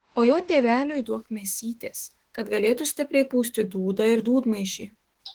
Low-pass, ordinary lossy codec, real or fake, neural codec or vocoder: 19.8 kHz; Opus, 16 kbps; fake; autoencoder, 48 kHz, 32 numbers a frame, DAC-VAE, trained on Japanese speech